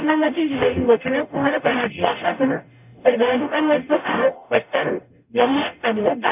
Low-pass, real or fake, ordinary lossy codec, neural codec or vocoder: 3.6 kHz; fake; none; codec, 44.1 kHz, 0.9 kbps, DAC